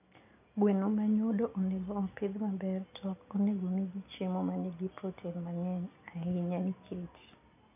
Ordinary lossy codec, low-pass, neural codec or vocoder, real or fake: none; 3.6 kHz; none; real